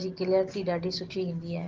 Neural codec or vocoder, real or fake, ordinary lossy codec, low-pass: none; real; Opus, 16 kbps; 7.2 kHz